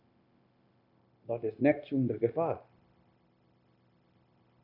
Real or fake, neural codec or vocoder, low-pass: fake; codec, 16 kHz, 0.9 kbps, LongCat-Audio-Codec; 5.4 kHz